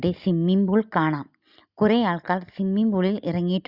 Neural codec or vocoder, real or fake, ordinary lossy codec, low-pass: none; real; Opus, 64 kbps; 5.4 kHz